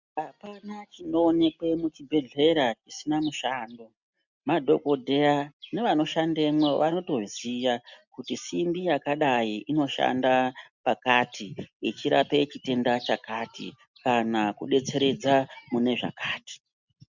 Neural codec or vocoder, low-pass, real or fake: none; 7.2 kHz; real